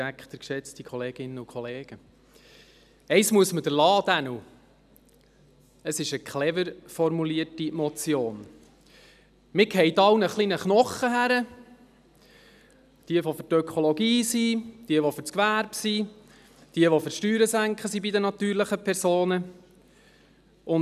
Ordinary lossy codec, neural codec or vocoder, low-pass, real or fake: none; none; 14.4 kHz; real